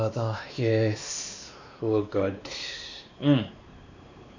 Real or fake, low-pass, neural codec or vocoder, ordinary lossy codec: fake; 7.2 kHz; codec, 16 kHz, 2 kbps, X-Codec, WavLM features, trained on Multilingual LibriSpeech; none